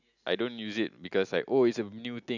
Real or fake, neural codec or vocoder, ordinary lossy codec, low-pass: real; none; none; 7.2 kHz